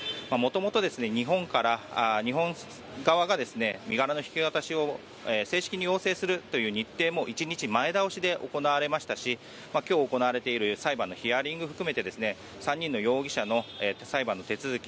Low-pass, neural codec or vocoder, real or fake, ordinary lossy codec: none; none; real; none